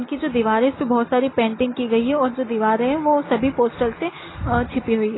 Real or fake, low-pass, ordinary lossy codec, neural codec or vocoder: real; 7.2 kHz; AAC, 16 kbps; none